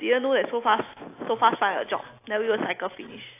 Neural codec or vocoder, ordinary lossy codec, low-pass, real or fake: none; AAC, 24 kbps; 3.6 kHz; real